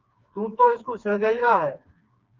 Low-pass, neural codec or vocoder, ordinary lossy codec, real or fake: 7.2 kHz; codec, 16 kHz, 2 kbps, FreqCodec, smaller model; Opus, 32 kbps; fake